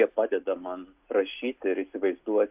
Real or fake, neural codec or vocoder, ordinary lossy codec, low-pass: real; none; AAC, 32 kbps; 3.6 kHz